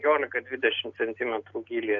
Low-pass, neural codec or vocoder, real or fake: 7.2 kHz; none; real